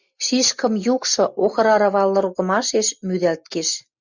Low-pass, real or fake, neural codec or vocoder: 7.2 kHz; real; none